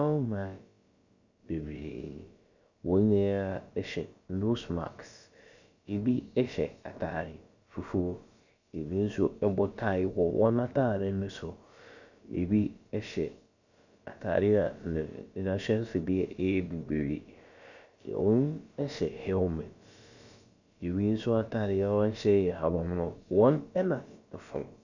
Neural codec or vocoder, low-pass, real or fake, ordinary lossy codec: codec, 16 kHz, about 1 kbps, DyCAST, with the encoder's durations; 7.2 kHz; fake; Opus, 64 kbps